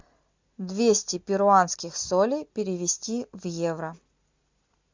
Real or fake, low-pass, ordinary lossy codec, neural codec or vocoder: real; 7.2 kHz; MP3, 64 kbps; none